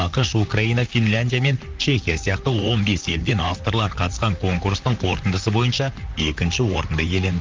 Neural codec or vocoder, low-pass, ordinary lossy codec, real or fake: vocoder, 44.1 kHz, 128 mel bands, Pupu-Vocoder; 7.2 kHz; Opus, 24 kbps; fake